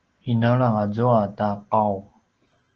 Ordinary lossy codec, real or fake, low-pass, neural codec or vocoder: Opus, 16 kbps; real; 7.2 kHz; none